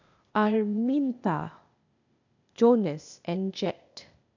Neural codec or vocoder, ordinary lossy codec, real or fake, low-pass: codec, 16 kHz, 0.8 kbps, ZipCodec; none; fake; 7.2 kHz